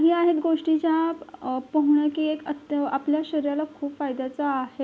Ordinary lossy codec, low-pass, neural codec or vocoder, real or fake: none; none; none; real